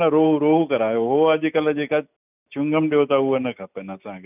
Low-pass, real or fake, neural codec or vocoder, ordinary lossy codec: 3.6 kHz; real; none; none